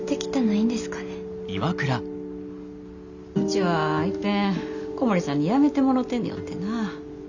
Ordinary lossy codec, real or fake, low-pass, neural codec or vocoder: none; real; 7.2 kHz; none